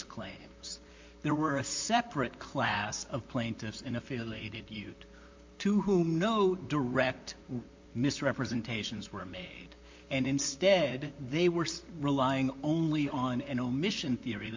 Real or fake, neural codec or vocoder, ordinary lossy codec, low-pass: fake; vocoder, 44.1 kHz, 128 mel bands, Pupu-Vocoder; MP3, 48 kbps; 7.2 kHz